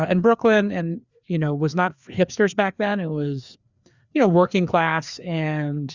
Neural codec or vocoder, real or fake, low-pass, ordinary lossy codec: codec, 16 kHz, 2 kbps, FreqCodec, larger model; fake; 7.2 kHz; Opus, 64 kbps